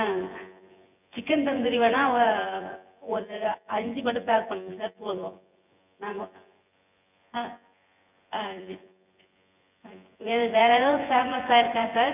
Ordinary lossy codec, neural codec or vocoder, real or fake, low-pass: none; vocoder, 24 kHz, 100 mel bands, Vocos; fake; 3.6 kHz